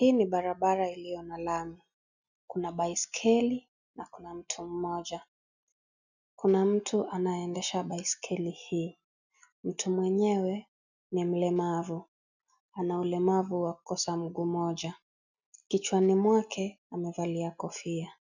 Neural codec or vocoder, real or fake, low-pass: none; real; 7.2 kHz